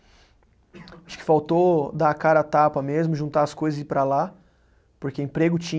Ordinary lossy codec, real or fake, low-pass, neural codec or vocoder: none; real; none; none